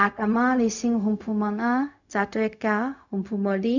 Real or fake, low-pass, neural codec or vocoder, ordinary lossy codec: fake; 7.2 kHz; codec, 16 kHz, 0.4 kbps, LongCat-Audio-Codec; none